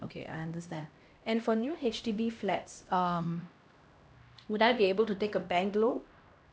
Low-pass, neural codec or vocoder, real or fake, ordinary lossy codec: none; codec, 16 kHz, 1 kbps, X-Codec, HuBERT features, trained on LibriSpeech; fake; none